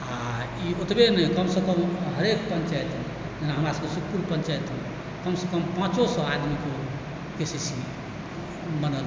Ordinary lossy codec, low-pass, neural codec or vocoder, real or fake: none; none; none; real